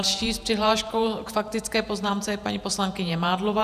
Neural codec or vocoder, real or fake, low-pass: vocoder, 48 kHz, 128 mel bands, Vocos; fake; 14.4 kHz